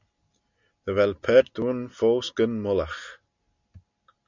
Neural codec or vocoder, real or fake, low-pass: none; real; 7.2 kHz